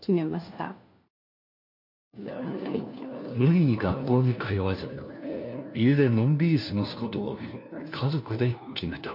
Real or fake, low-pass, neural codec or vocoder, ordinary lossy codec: fake; 5.4 kHz; codec, 16 kHz, 1 kbps, FunCodec, trained on LibriTTS, 50 frames a second; MP3, 32 kbps